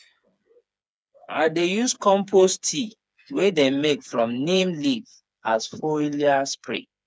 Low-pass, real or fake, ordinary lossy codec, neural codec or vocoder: none; fake; none; codec, 16 kHz, 4 kbps, FreqCodec, smaller model